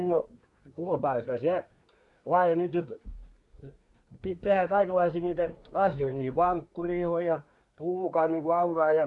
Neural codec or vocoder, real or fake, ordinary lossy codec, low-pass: codec, 24 kHz, 1 kbps, SNAC; fake; MP3, 96 kbps; 10.8 kHz